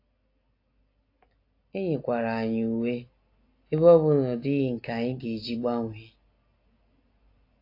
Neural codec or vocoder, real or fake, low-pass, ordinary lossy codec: none; real; 5.4 kHz; AAC, 32 kbps